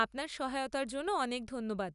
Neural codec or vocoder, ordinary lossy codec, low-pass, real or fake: none; none; 10.8 kHz; real